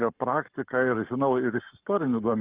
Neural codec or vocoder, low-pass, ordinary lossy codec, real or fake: codec, 44.1 kHz, 7.8 kbps, Pupu-Codec; 3.6 kHz; Opus, 24 kbps; fake